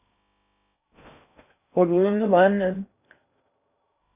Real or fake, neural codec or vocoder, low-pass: fake; codec, 16 kHz in and 24 kHz out, 0.6 kbps, FocalCodec, streaming, 2048 codes; 3.6 kHz